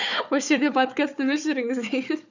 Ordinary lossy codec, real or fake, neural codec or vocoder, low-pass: none; fake; codec, 16 kHz, 4 kbps, FunCodec, trained on Chinese and English, 50 frames a second; 7.2 kHz